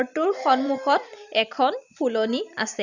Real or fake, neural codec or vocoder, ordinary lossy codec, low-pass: fake; vocoder, 44.1 kHz, 128 mel bands every 256 samples, BigVGAN v2; none; 7.2 kHz